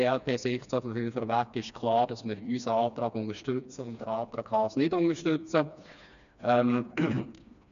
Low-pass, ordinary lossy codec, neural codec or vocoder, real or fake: 7.2 kHz; none; codec, 16 kHz, 2 kbps, FreqCodec, smaller model; fake